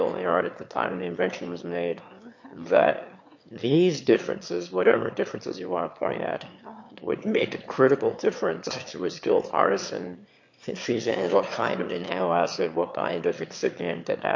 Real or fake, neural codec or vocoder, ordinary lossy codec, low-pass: fake; autoencoder, 22.05 kHz, a latent of 192 numbers a frame, VITS, trained on one speaker; MP3, 48 kbps; 7.2 kHz